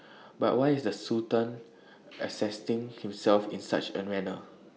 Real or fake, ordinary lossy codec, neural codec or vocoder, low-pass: real; none; none; none